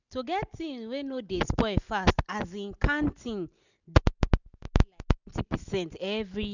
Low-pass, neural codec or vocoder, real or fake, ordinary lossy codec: 7.2 kHz; none; real; none